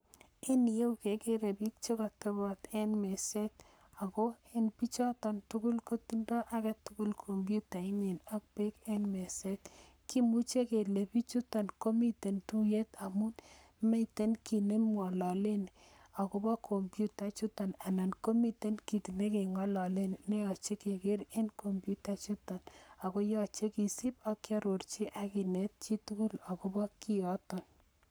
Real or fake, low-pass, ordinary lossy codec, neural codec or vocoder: fake; none; none; codec, 44.1 kHz, 7.8 kbps, Pupu-Codec